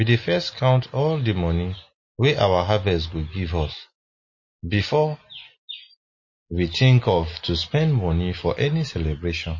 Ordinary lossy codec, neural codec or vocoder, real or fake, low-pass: MP3, 32 kbps; none; real; 7.2 kHz